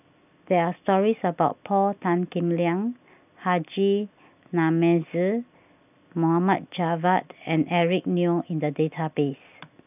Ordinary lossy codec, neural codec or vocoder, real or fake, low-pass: none; none; real; 3.6 kHz